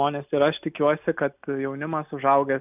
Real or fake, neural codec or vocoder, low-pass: real; none; 3.6 kHz